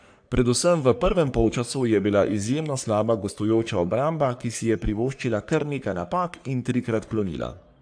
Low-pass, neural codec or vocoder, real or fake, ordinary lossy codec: 9.9 kHz; codec, 44.1 kHz, 3.4 kbps, Pupu-Codec; fake; AAC, 64 kbps